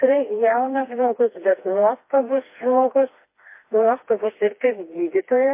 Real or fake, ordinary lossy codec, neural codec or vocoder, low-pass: fake; MP3, 24 kbps; codec, 16 kHz, 2 kbps, FreqCodec, smaller model; 3.6 kHz